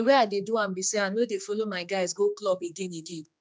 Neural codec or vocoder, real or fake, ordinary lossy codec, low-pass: codec, 16 kHz, 2 kbps, X-Codec, HuBERT features, trained on general audio; fake; none; none